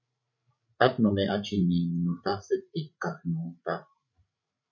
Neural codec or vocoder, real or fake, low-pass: codec, 16 kHz, 16 kbps, FreqCodec, larger model; fake; 7.2 kHz